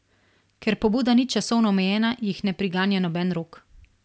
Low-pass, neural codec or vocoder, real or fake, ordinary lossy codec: none; none; real; none